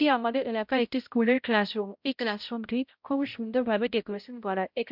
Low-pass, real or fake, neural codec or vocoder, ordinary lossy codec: 5.4 kHz; fake; codec, 16 kHz, 0.5 kbps, X-Codec, HuBERT features, trained on balanced general audio; MP3, 48 kbps